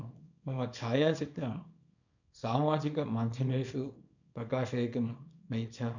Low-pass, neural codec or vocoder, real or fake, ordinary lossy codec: 7.2 kHz; codec, 24 kHz, 0.9 kbps, WavTokenizer, small release; fake; none